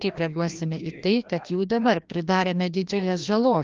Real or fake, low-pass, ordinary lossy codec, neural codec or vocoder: fake; 7.2 kHz; Opus, 24 kbps; codec, 16 kHz, 1 kbps, FreqCodec, larger model